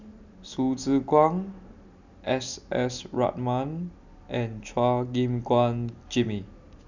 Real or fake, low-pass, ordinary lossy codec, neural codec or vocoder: real; 7.2 kHz; none; none